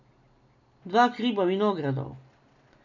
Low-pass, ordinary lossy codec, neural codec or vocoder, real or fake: 7.2 kHz; none; none; real